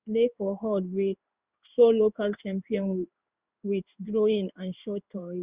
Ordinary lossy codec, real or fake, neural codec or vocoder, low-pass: Opus, 64 kbps; fake; codec, 16 kHz in and 24 kHz out, 1 kbps, XY-Tokenizer; 3.6 kHz